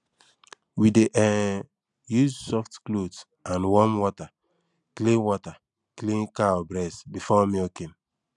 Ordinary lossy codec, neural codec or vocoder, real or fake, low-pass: none; none; real; 10.8 kHz